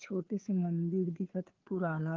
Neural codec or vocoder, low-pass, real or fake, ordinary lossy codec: codec, 16 kHz, 2 kbps, FunCodec, trained on Chinese and English, 25 frames a second; 7.2 kHz; fake; Opus, 16 kbps